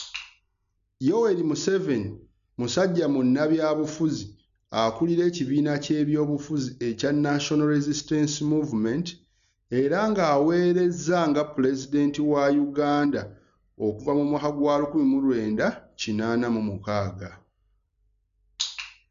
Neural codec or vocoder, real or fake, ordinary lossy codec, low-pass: none; real; none; 7.2 kHz